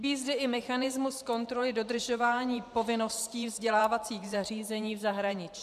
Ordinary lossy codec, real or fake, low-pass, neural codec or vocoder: MP3, 96 kbps; fake; 14.4 kHz; vocoder, 44.1 kHz, 128 mel bands every 512 samples, BigVGAN v2